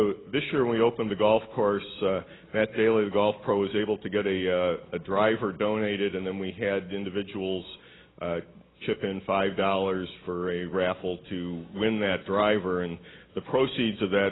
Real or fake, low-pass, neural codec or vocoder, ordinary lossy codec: real; 7.2 kHz; none; AAC, 16 kbps